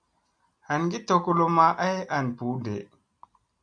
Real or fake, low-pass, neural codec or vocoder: real; 9.9 kHz; none